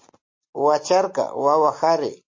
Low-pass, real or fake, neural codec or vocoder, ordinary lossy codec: 7.2 kHz; real; none; MP3, 32 kbps